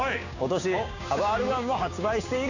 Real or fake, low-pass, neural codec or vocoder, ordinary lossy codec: fake; 7.2 kHz; codec, 16 kHz, 6 kbps, DAC; none